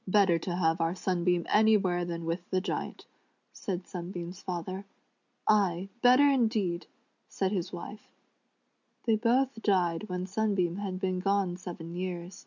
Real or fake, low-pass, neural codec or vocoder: real; 7.2 kHz; none